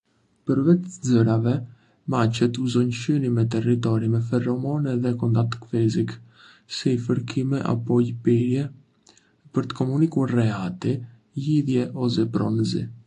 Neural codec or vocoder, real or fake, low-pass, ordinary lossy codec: none; real; 9.9 kHz; AAC, 48 kbps